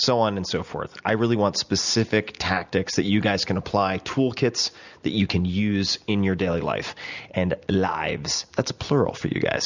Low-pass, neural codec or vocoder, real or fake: 7.2 kHz; none; real